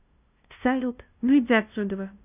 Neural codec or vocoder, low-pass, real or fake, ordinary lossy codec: codec, 16 kHz, 0.5 kbps, FunCodec, trained on LibriTTS, 25 frames a second; 3.6 kHz; fake; none